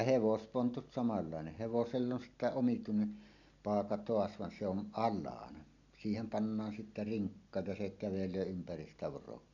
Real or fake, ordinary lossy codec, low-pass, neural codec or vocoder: real; none; 7.2 kHz; none